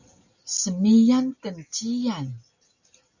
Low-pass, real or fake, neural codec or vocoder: 7.2 kHz; real; none